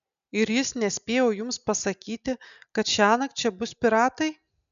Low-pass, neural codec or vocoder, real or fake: 7.2 kHz; none; real